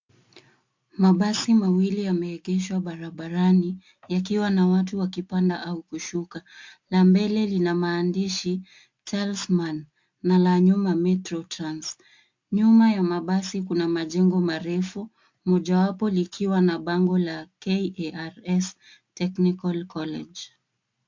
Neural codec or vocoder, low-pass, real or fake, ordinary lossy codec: none; 7.2 kHz; real; MP3, 48 kbps